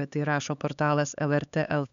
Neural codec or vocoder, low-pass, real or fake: codec, 16 kHz, 4 kbps, X-Codec, HuBERT features, trained on LibriSpeech; 7.2 kHz; fake